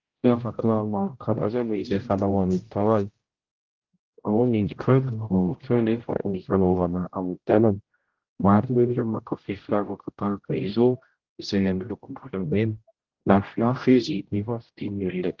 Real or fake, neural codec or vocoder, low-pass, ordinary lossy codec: fake; codec, 16 kHz, 0.5 kbps, X-Codec, HuBERT features, trained on general audio; 7.2 kHz; Opus, 16 kbps